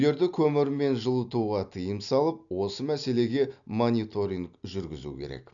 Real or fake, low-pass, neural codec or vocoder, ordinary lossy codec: real; 7.2 kHz; none; none